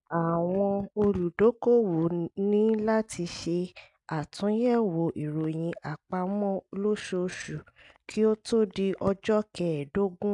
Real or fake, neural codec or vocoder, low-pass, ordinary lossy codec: real; none; 10.8 kHz; MP3, 96 kbps